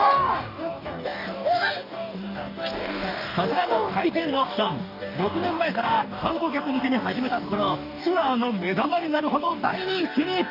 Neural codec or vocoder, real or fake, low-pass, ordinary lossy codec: codec, 44.1 kHz, 2.6 kbps, DAC; fake; 5.4 kHz; none